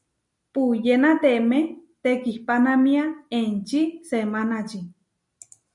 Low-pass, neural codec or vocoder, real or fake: 10.8 kHz; none; real